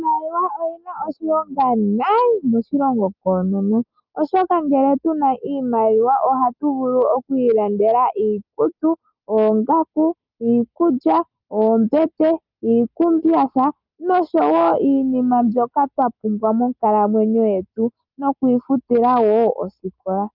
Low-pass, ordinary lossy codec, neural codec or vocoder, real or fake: 5.4 kHz; Opus, 24 kbps; none; real